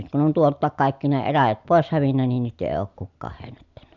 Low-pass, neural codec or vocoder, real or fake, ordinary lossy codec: 7.2 kHz; none; real; none